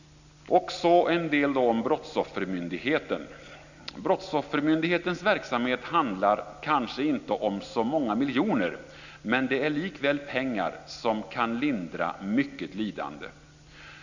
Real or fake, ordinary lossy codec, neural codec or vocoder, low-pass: real; none; none; 7.2 kHz